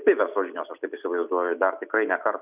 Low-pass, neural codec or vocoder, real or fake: 3.6 kHz; none; real